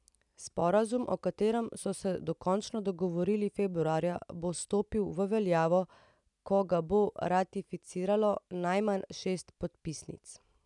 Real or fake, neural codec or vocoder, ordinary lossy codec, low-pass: real; none; none; 10.8 kHz